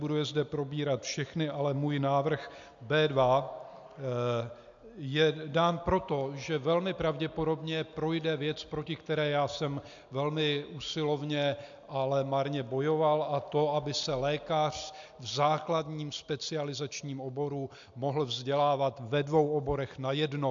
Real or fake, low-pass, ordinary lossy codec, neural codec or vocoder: real; 7.2 kHz; MP3, 64 kbps; none